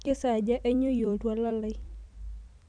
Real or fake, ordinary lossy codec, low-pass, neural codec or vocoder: fake; none; 9.9 kHz; vocoder, 44.1 kHz, 128 mel bands every 256 samples, BigVGAN v2